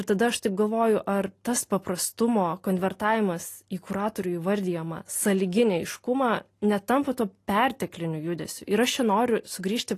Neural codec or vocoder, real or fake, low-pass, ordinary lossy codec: none; real; 14.4 kHz; AAC, 48 kbps